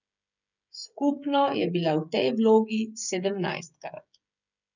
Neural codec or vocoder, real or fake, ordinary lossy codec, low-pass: codec, 16 kHz, 16 kbps, FreqCodec, smaller model; fake; none; 7.2 kHz